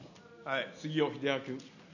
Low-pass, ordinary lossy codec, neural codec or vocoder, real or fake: 7.2 kHz; none; none; real